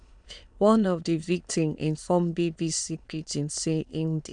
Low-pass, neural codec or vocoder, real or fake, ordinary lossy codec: 9.9 kHz; autoencoder, 22.05 kHz, a latent of 192 numbers a frame, VITS, trained on many speakers; fake; none